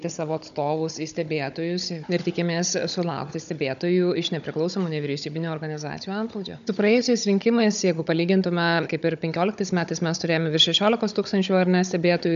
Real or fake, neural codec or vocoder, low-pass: fake; codec, 16 kHz, 16 kbps, FunCodec, trained on Chinese and English, 50 frames a second; 7.2 kHz